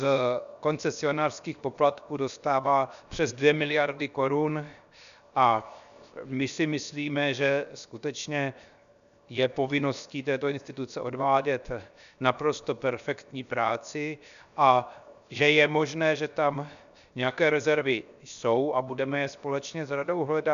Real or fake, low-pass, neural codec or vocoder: fake; 7.2 kHz; codec, 16 kHz, 0.7 kbps, FocalCodec